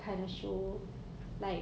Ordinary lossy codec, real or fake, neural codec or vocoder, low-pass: none; real; none; none